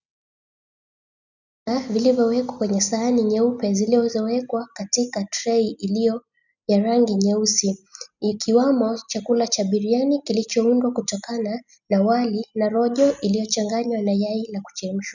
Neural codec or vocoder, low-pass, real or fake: none; 7.2 kHz; real